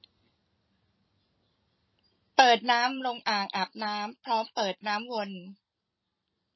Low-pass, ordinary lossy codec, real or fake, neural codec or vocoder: 7.2 kHz; MP3, 24 kbps; fake; vocoder, 44.1 kHz, 128 mel bands every 256 samples, BigVGAN v2